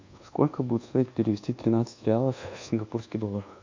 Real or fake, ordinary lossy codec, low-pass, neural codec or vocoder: fake; MP3, 48 kbps; 7.2 kHz; codec, 24 kHz, 1.2 kbps, DualCodec